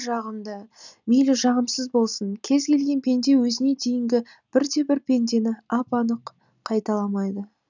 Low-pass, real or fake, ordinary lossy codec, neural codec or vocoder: 7.2 kHz; real; none; none